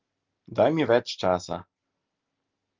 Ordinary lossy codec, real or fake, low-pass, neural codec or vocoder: Opus, 24 kbps; real; 7.2 kHz; none